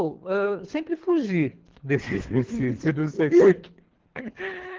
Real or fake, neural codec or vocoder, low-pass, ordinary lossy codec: fake; codec, 24 kHz, 3 kbps, HILCodec; 7.2 kHz; Opus, 16 kbps